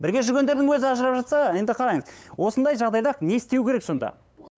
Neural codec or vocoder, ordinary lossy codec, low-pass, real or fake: codec, 16 kHz, 8 kbps, FunCodec, trained on LibriTTS, 25 frames a second; none; none; fake